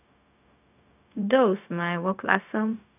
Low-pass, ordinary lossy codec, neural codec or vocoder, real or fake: 3.6 kHz; none; codec, 16 kHz, 0.4 kbps, LongCat-Audio-Codec; fake